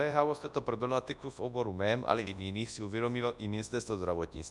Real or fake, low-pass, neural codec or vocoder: fake; 10.8 kHz; codec, 24 kHz, 0.9 kbps, WavTokenizer, large speech release